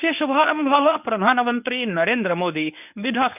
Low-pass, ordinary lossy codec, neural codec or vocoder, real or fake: 3.6 kHz; none; codec, 24 kHz, 0.9 kbps, WavTokenizer, medium speech release version 1; fake